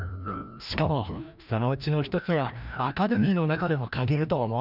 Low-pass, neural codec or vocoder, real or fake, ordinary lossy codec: 5.4 kHz; codec, 16 kHz, 1 kbps, FreqCodec, larger model; fake; none